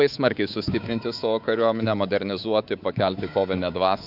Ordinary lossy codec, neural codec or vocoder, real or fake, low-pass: AAC, 48 kbps; codec, 24 kHz, 3.1 kbps, DualCodec; fake; 5.4 kHz